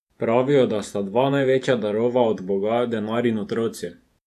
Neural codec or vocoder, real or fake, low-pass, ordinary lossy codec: vocoder, 48 kHz, 128 mel bands, Vocos; fake; 14.4 kHz; none